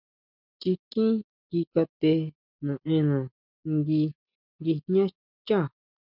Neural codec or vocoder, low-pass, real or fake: none; 5.4 kHz; real